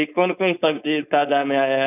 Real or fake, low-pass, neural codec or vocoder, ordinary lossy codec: fake; 3.6 kHz; codec, 16 kHz, 4.8 kbps, FACodec; none